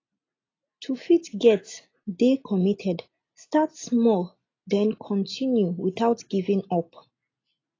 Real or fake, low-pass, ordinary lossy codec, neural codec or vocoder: real; 7.2 kHz; AAC, 32 kbps; none